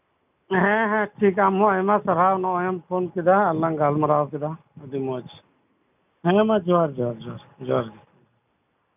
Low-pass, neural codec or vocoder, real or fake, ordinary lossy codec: 3.6 kHz; none; real; none